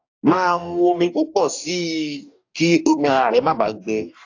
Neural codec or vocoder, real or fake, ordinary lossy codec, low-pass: codec, 44.1 kHz, 2.6 kbps, DAC; fake; AAC, 48 kbps; 7.2 kHz